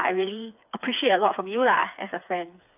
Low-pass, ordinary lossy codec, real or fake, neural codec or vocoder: 3.6 kHz; AAC, 32 kbps; fake; codec, 16 kHz, 4 kbps, FreqCodec, smaller model